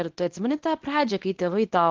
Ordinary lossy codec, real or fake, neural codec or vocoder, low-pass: Opus, 16 kbps; fake; codec, 24 kHz, 0.9 kbps, WavTokenizer, medium speech release version 2; 7.2 kHz